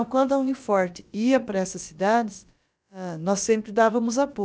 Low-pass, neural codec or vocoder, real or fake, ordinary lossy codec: none; codec, 16 kHz, about 1 kbps, DyCAST, with the encoder's durations; fake; none